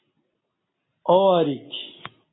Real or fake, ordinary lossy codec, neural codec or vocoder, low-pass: real; AAC, 16 kbps; none; 7.2 kHz